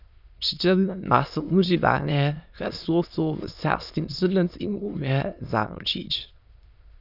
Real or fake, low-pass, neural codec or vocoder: fake; 5.4 kHz; autoencoder, 22.05 kHz, a latent of 192 numbers a frame, VITS, trained on many speakers